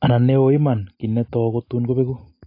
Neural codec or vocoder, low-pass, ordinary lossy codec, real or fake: none; 5.4 kHz; AAC, 32 kbps; real